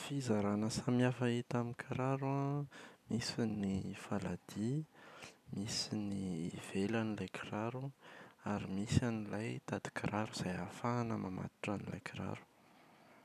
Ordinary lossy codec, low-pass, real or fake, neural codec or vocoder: none; 14.4 kHz; real; none